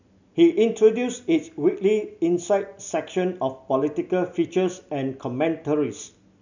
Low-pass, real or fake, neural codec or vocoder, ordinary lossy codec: 7.2 kHz; real; none; none